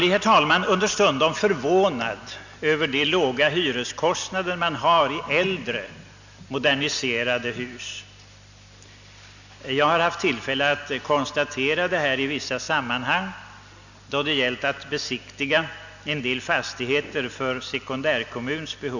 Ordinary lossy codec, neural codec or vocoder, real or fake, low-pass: none; none; real; 7.2 kHz